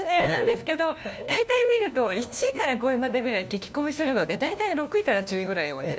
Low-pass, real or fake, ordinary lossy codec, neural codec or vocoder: none; fake; none; codec, 16 kHz, 1 kbps, FunCodec, trained on LibriTTS, 50 frames a second